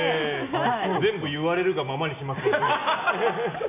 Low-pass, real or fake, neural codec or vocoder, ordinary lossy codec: 3.6 kHz; real; none; MP3, 32 kbps